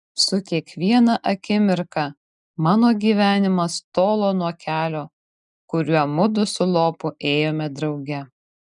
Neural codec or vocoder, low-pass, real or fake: none; 10.8 kHz; real